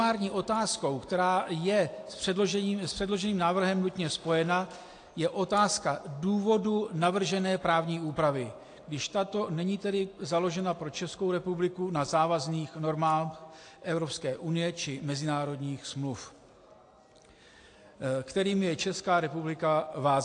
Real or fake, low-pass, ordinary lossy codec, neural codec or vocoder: real; 9.9 kHz; AAC, 48 kbps; none